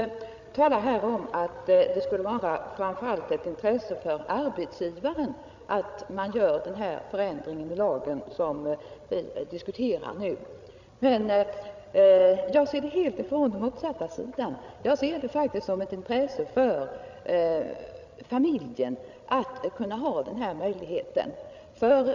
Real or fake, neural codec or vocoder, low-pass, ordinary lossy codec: fake; codec, 16 kHz, 16 kbps, FreqCodec, larger model; 7.2 kHz; none